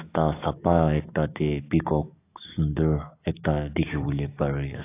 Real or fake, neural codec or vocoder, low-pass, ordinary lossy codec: fake; codec, 44.1 kHz, 7.8 kbps, DAC; 3.6 kHz; AAC, 24 kbps